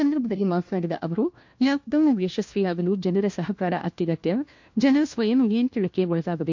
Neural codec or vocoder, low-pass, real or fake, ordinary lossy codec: codec, 16 kHz, 1 kbps, FunCodec, trained on LibriTTS, 50 frames a second; 7.2 kHz; fake; MP3, 48 kbps